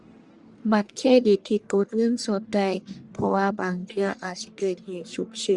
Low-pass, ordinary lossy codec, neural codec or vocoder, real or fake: 10.8 kHz; Opus, 64 kbps; codec, 44.1 kHz, 1.7 kbps, Pupu-Codec; fake